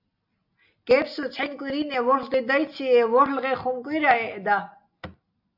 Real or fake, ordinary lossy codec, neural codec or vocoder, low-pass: real; AAC, 48 kbps; none; 5.4 kHz